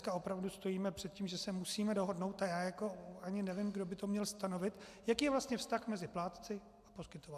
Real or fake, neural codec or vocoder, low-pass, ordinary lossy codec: real; none; 14.4 kHz; AAC, 96 kbps